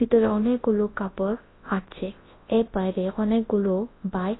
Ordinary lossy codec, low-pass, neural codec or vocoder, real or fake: AAC, 16 kbps; 7.2 kHz; codec, 24 kHz, 0.9 kbps, WavTokenizer, large speech release; fake